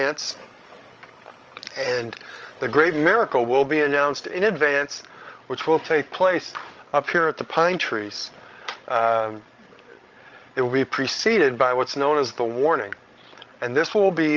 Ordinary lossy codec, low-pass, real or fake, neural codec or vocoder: Opus, 24 kbps; 7.2 kHz; fake; codec, 16 kHz, 16 kbps, FreqCodec, larger model